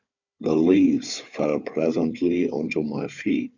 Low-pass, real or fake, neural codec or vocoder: 7.2 kHz; fake; codec, 16 kHz, 4 kbps, FunCodec, trained on Chinese and English, 50 frames a second